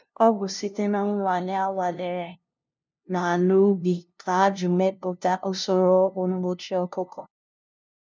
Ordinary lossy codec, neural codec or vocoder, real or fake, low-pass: none; codec, 16 kHz, 0.5 kbps, FunCodec, trained on LibriTTS, 25 frames a second; fake; none